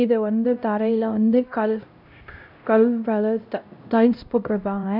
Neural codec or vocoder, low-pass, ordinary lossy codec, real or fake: codec, 16 kHz, 0.5 kbps, X-Codec, HuBERT features, trained on LibriSpeech; 5.4 kHz; none; fake